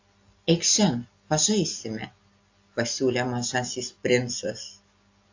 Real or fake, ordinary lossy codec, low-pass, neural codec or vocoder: real; AAC, 48 kbps; 7.2 kHz; none